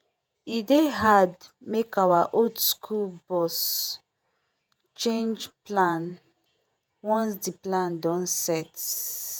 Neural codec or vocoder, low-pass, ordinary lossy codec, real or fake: vocoder, 48 kHz, 128 mel bands, Vocos; none; none; fake